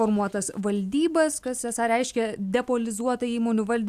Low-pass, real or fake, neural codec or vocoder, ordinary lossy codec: 14.4 kHz; real; none; AAC, 96 kbps